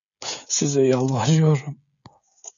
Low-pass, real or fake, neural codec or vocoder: 7.2 kHz; fake; codec, 16 kHz, 16 kbps, FreqCodec, smaller model